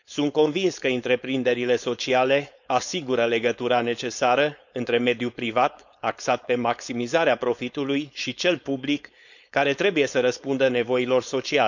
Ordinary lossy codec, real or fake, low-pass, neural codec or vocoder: none; fake; 7.2 kHz; codec, 16 kHz, 4.8 kbps, FACodec